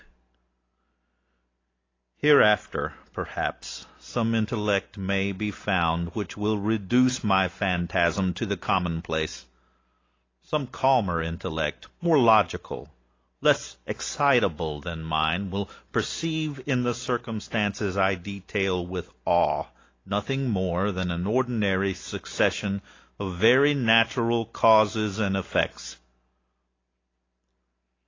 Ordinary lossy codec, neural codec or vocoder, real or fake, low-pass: AAC, 32 kbps; none; real; 7.2 kHz